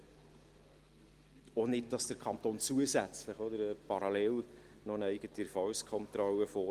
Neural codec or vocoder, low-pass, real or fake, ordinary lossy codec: none; 14.4 kHz; real; Opus, 24 kbps